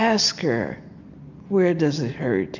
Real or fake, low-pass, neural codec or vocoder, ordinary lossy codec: real; 7.2 kHz; none; AAC, 48 kbps